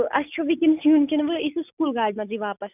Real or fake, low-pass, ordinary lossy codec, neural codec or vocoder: real; 3.6 kHz; none; none